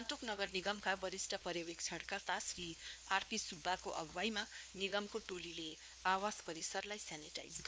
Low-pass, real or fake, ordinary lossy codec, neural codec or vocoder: none; fake; none; codec, 16 kHz, 2 kbps, X-Codec, WavLM features, trained on Multilingual LibriSpeech